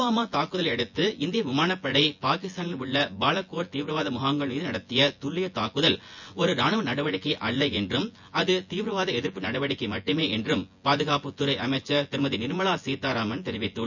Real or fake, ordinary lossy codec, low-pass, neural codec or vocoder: fake; none; 7.2 kHz; vocoder, 24 kHz, 100 mel bands, Vocos